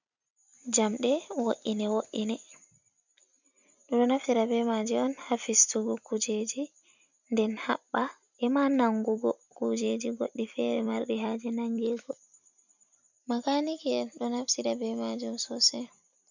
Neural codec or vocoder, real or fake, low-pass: vocoder, 44.1 kHz, 128 mel bands every 256 samples, BigVGAN v2; fake; 7.2 kHz